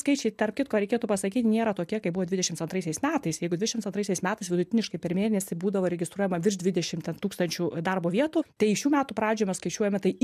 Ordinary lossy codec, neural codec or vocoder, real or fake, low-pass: MP3, 96 kbps; none; real; 14.4 kHz